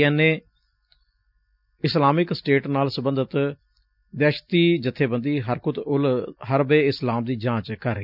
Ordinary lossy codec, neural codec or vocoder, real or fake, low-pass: none; none; real; 5.4 kHz